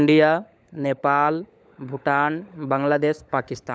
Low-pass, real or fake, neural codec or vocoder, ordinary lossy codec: none; fake; codec, 16 kHz, 16 kbps, FunCodec, trained on LibriTTS, 50 frames a second; none